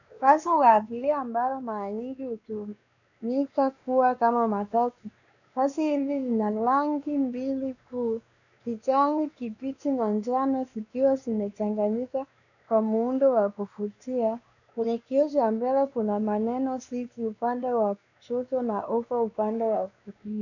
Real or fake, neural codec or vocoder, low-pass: fake; codec, 16 kHz, 2 kbps, X-Codec, WavLM features, trained on Multilingual LibriSpeech; 7.2 kHz